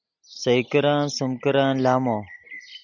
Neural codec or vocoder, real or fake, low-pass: none; real; 7.2 kHz